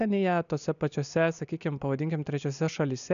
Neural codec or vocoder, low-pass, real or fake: none; 7.2 kHz; real